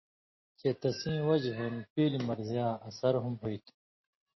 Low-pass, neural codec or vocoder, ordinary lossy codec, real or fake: 7.2 kHz; none; MP3, 24 kbps; real